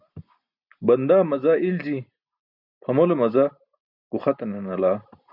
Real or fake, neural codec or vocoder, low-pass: real; none; 5.4 kHz